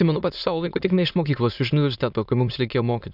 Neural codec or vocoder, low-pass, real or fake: autoencoder, 22.05 kHz, a latent of 192 numbers a frame, VITS, trained on many speakers; 5.4 kHz; fake